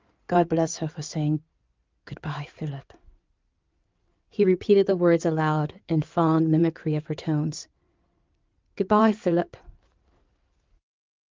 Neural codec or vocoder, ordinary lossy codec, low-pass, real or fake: codec, 16 kHz in and 24 kHz out, 2.2 kbps, FireRedTTS-2 codec; Opus, 32 kbps; 7.2 kHz; fake